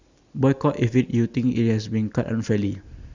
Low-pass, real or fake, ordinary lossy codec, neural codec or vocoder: 7.2 kHz; real; Opus, 64 kbps; none